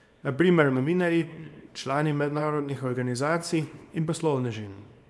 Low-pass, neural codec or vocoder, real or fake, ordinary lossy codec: none; codec, 24 kHz, 0.9 kbps, WavTokenizer, small release; fake; none